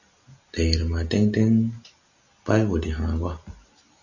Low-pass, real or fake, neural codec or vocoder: 7.2 kHz; real; none